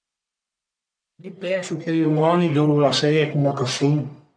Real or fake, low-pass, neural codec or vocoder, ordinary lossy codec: fake; 9.9 kHz; codec, 44.1 kHz, 1.7 kbps, Pupu-Codec; MP3, 64 kbps